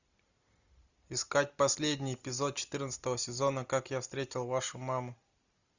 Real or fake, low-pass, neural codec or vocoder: real; 7.2 kHz; none